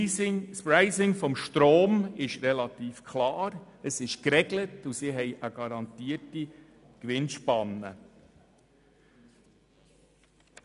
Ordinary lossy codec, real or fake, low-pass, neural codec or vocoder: none; real; 10.8 kHz; none